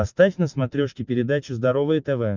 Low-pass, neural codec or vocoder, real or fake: 7.2 kHz; none; real